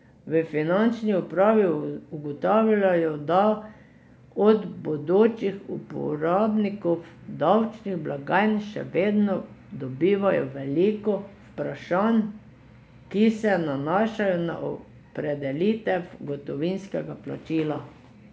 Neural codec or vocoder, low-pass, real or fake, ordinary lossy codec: none; none; real; none